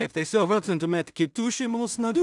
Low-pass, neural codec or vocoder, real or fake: 10.8 kHz; codec, 16 kHz in and 24 kHz out, 0.4 kbps, LongCat-Audio-Codec, two codebook decoder; fake